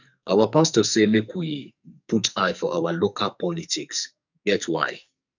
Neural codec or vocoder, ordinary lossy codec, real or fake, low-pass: codec, 44.1 kHz, 2.6 kbps, SNAC; none; fake; 7.2 kHz